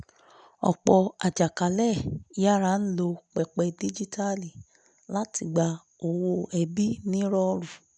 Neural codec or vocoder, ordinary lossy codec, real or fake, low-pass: none; none; real; 9.9 kHz